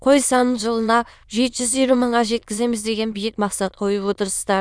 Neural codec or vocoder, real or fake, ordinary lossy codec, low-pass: autoencoder, 22.05 kHz, a latent of 192 numbers a frame, VITS, trained on many speakers; fake; none; none